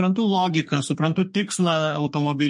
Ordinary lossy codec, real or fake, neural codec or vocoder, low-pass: MP3, 48 kbps; fake; codec, 32 kHz, 1.9 kbps, SNAC; 10.8 kHz